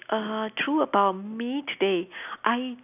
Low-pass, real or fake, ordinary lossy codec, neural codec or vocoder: 3.6 kHz; real; none; none